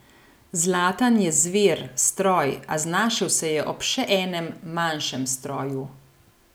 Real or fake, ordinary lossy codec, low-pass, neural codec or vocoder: real; none; none; none